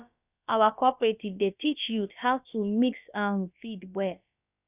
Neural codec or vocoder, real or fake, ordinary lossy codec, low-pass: codec, 16 kHz, about 1 kbps, DyCAST, with the encoder's durations; fake; none; 3.6 kHz